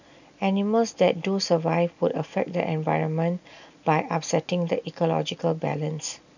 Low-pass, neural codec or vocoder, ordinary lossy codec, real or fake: 7.2 kHz; none; none; real